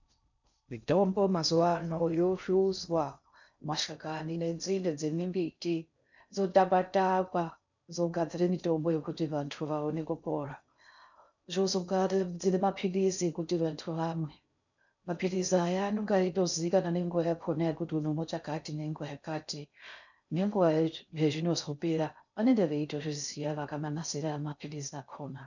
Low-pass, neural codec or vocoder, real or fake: 7.2 kHz; codec, 16 kHz in and 24 kHz out, 0.6 kbps, FocalCodec, streaming, 4096 codes; fake